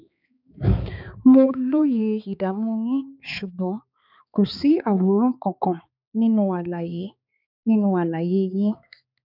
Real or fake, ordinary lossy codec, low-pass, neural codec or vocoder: fake; none; 5.4 kHz; codec, 16 kHz, 4 kbps, X-Codec, HuBERT features, trained on balanced general audio